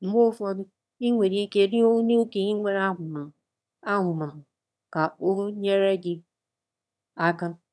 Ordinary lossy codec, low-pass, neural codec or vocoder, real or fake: none; none; autoencoder, 22.05 kHz, a latent of 192 numbers a frame, VITS, trained on one speaker; fake